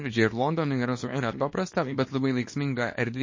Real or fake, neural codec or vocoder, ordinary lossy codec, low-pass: fake; codec, 24 kHz, 0.9 kbps, WavTokenizer, small release; MP3, 32 kbps; 7.2 kHz